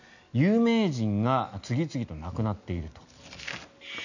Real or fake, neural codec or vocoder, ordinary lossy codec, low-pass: real; none; none; 7.2 kHz